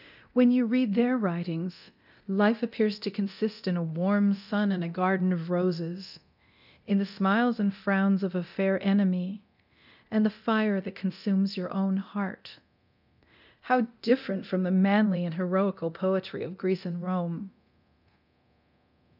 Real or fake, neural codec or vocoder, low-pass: fake; codec, 24 kHz, 0.9 kbps, DualCodec; 5.4 kHz